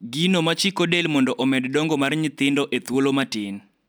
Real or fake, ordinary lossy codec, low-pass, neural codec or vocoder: real; none; none; none